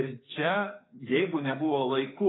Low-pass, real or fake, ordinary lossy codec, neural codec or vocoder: 7.2 kHz; fake; AAC, 16 kbps; codec, 44.1 kHz, 2.6 kbps, SNAC